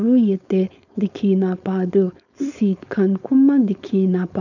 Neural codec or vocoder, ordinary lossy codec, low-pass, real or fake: codec, 16 kHz, 4.8 kbps, FACodec; none; 7.2 kHz; fake